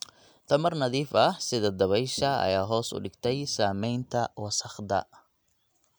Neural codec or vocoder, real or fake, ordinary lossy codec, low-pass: none; real; none; none